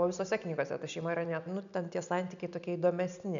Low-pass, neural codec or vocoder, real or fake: 7.2 kHz; none; real